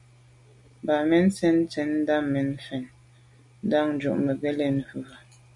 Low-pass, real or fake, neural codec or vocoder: 10.8 kHz; real; none